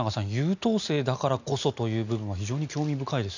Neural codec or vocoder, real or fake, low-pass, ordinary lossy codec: none; real; 7.2 kHz; none